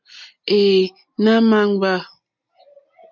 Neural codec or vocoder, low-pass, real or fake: none; 7.2 kHz; real